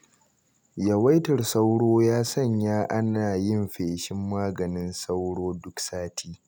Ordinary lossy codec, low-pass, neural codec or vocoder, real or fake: none; none; none; real